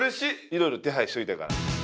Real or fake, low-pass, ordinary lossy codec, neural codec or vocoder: real; none; none; none